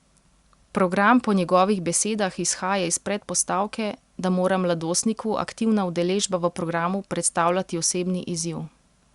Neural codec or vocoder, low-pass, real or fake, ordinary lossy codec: none; 10.8 kHz; real; Opus, 64 kbps